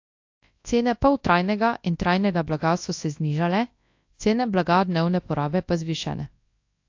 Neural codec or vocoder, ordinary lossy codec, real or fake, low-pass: codec, 24 kHz, 0.9 kbps, WavTokenizer, large speech release; AAC, 48 kbps; fake; 7.2 kHz